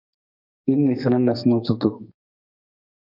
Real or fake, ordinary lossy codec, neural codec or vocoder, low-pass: fake; MP3, 48 kbps; codec, 32 kHz, 1.9 kbps, SNAC; 5.4 kHz